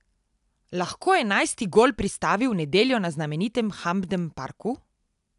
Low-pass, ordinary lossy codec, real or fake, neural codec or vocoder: 10.8 kHz; none; real; none